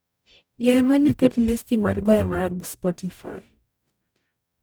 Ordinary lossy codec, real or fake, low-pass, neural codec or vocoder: none; fake; none; codec, 44.1 kHz, 0.9 kbps, DAC